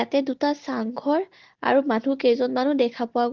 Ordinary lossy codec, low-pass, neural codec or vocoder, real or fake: Opus, 16 kbps; 7.2 kHz; none; real